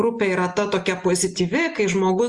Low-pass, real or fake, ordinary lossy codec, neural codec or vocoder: 10.8 kHz; real; Opus, 32 kbps; none